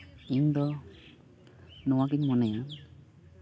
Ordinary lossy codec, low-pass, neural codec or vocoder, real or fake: none; none; none; real